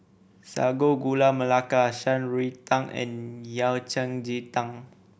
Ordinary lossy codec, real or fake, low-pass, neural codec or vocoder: none; real; none; none